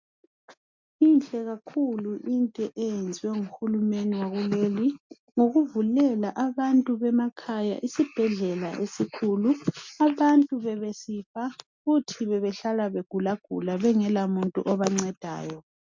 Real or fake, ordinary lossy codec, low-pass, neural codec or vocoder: real; MP3, 64 kbps; 7.2 kHz; none